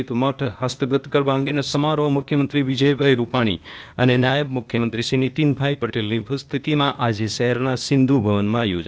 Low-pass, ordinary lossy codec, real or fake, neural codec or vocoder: none; none; fake; codec, 16 kHz, 0.8 kbps, ZipCodec